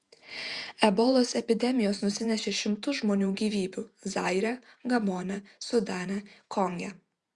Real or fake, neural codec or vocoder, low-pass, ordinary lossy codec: fake; vocoder, 48 kHz, 128 mel bands, Vocos; 10.8 kHz; Opus, 64 kbps